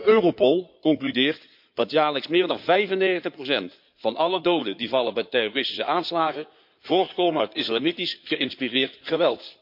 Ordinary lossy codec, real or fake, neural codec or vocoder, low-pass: MP3, 48 kbps; fake; codec, 16 kHz in and 24 kHz out, 2.2 kbps, FireRedTTS-2 codec; 5.4 kHz